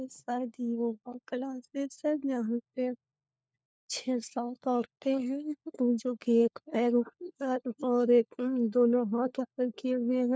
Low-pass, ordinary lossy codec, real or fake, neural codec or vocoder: none; none; fake; codec, 16 kHz, 4 kbps, FunCodec, trained on LibriTTS, 50 frames a second